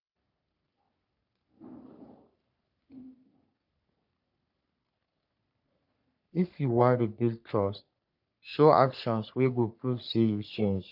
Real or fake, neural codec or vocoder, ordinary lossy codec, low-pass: fake; codec, 44.1 kHz, 3.4 kbps, Pupu-Codec; none; 5.4 kHz